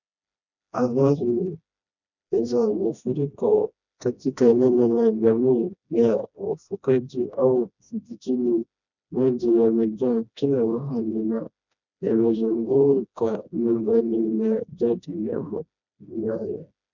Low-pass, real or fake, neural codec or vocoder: 7.2 kHz; fake; codec, 16 kHz, 1 kbps, FreqCodec, smaller model